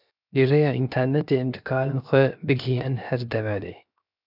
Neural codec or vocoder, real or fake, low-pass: codec, 16 kHz, 0.7 kbps, FocalCodec; fake; 5.4 kHz